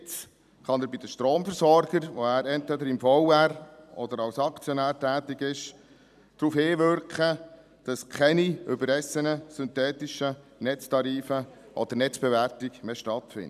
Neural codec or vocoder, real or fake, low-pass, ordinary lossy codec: vocoder, 44.1 kHz, 128 mel bands every 256 samples, BigVGAN v2; fake; 14.4 kHz; none